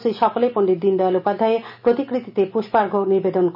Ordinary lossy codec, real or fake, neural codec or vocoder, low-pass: none; real; none; 5.4 kHz